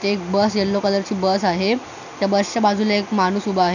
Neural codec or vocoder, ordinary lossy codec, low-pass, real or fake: none; none; 7.2 kHz; real